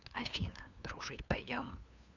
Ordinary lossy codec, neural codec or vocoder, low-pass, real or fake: none; codec, 16 kHz, 2 kbps, FunCodec, trained on LibriTTS, 25 frames a second; 7.2 kHz; fake